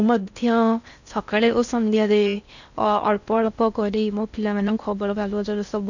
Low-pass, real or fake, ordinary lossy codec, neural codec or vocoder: 7.2 kHz; fake; none; codec, 16 kHz in and 24 kHz out, 0.6 kbps, FocalCodec, streaming, 4096 codes